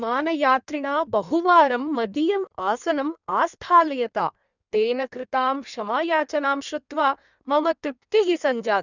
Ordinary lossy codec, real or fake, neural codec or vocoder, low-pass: none; fake; codec, 16 kHz in and 24 kHz out, 1.1 kbps, FireRedTTS-2 codec; 7.2 kHz